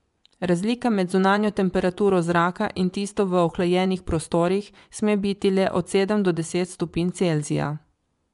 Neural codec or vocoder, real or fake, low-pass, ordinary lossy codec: vocoder, 24 kHz, 100 mel bands, Vocos; fake; 10.8 kHz; MP3, 96 kbps